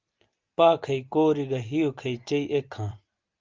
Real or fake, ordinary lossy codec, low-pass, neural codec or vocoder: real; Opus, 24 kbps; 7.2 kHz; none